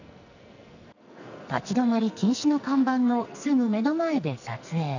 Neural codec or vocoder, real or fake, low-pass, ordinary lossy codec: codec, 44.1 kHz, 2.6 kbps, SNAC; fake; 7.2 kHz; none